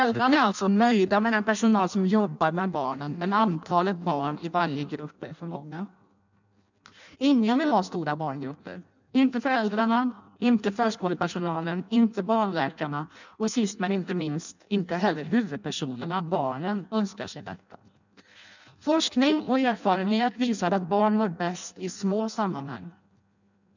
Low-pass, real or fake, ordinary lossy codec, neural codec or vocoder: 7.2 kHz; fake; none; codec, 16 kHz in and 24 kHz out, 0.6 kbps, FireRedTTS-2 codec